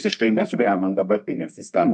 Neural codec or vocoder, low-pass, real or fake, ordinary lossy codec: codec, 24 kHz, 0.9 kbps, WavTokenizer, medium music audio release; 10.8 kHz; fake; MP3, 96 kbps